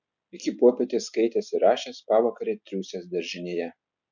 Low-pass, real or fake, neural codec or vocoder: 7.2 kHz; real; none